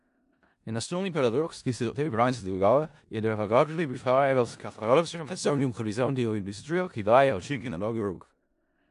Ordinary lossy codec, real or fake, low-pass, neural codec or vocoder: AAC, 64 kbps; fake; 10.8 kHz; codec, 16 kHz in and 24 kHz out, 0.4 kbps, LongCat-Audio-Codec, four codebook decoder